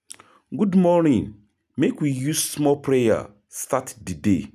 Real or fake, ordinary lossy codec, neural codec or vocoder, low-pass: real; none; none; 14.4 kHz